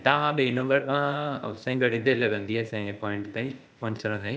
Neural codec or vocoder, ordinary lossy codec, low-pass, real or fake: codec, 16 kHz, 0.8 kbps, ZipCodec; none; none; fake